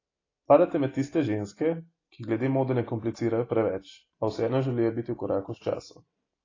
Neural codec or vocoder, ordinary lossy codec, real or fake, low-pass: none; AAC, 32 kbps; real; 7.2 kHz